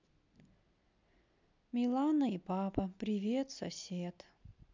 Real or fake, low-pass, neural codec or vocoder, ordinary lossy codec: real; 7.2 kHz; none; MP3, 64 kbps